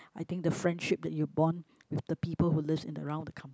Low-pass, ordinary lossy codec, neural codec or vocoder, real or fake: none; none; none; real